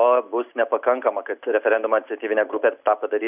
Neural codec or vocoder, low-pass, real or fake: none; 3.6 kHz; real